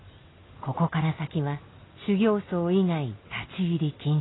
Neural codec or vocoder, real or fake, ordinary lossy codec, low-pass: none; real; AAC, 16 kbps; 7.2 kHz